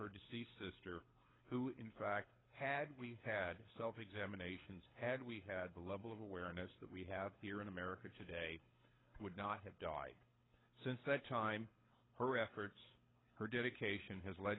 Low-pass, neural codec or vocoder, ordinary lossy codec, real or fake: 7.2 kHz; codec, 24 kHz, 6 kbps, HILCodec; AAC, 16 kbps; fake